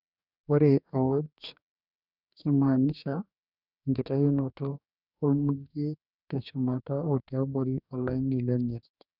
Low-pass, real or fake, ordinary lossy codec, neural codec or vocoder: 5.4 kHz; fake; none; codec, 44.1 kHz, 2.6 kbps, DAC